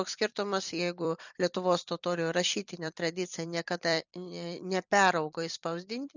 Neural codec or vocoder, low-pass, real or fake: vocoder, 44.1 kHz, 128 mel bands every 256 samples, BigVGAN v2; 7.2 kHz; fake